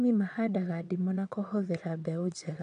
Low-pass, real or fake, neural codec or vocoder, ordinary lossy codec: 9.9 kHz; fake; vocoder, 22.05 kHz, 80 mel bands, WaveNeXt; none